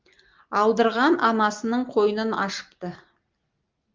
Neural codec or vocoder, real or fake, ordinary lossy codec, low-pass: none; real; Opus, 32 kbps; 7.2 kHz